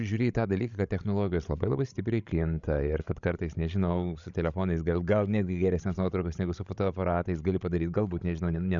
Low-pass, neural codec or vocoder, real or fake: 7.2 kHz; codec, 16 kHz, 16 kbps, FreqCodec, larger model; fake